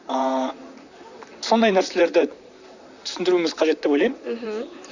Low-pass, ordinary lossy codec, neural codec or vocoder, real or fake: 7.2 kHz; none; vocoder, 44.1 kHz, 128 mel bands, Pupu-Vocoder; fake